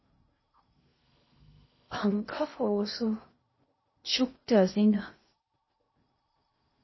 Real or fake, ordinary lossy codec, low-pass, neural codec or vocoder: fake; MP3, 24 kbps; 7.2 kHz; codec, 16 kHz in and 24 kHz out, 0.6 kbps, FocalCodec, streaming, 2048 codes